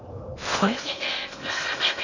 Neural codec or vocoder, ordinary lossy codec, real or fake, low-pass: codec, 16 kHz in and 24 kHz out, 0.8 kbps, FocalCodec, streaming, 65536 codes; none; fake; 7.2 kHz